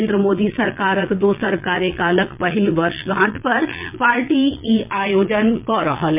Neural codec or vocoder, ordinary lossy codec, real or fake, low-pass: vocoder, 22.05 kHz, 80 mel bands, Vocos; MP3, 32 kbps; fake; 3.6 kHz